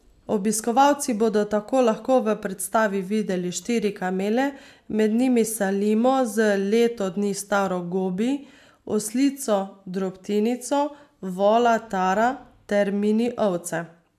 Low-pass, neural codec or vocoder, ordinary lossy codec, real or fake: 14.4 kHz; none; AAC, 96 kbps; real